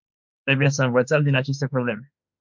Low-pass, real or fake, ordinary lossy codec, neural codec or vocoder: 7.2 kHz; fake; MP3, 64 kbps; autoencoder, 48 kHz, 32 numbers a frame, DAC-VAE, trained on Japanese speech